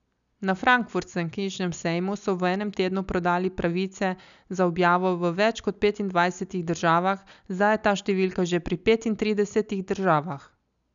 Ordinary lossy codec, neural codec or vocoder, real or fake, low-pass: none; none; real; 7.2 kHz